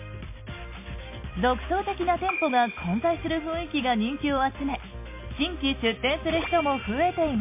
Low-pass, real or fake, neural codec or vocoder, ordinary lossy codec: 3.6 kHz; real; none; MP3, 24 kbps